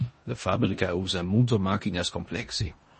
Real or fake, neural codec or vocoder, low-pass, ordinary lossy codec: fake; codec, 16 kHz in and 24 kHz out, 0.9 kbps, LongCat-Audio-Codec, four codebook decoder; 10.8 kHz; MP3, 32 kbps